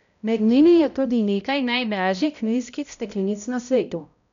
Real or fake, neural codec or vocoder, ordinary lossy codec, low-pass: fake; codec, 16 kHz, 0.5 kbps, X-Codec, HuBERT features, trained on balanced general audio; none; 7.2 kHz